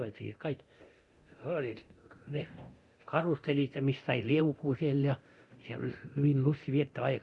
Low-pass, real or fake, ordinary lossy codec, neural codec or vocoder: 10.8 kHz; fake; Opus, 64 kbps; codec, 24 kHz, 0.9 kbps, DualCodec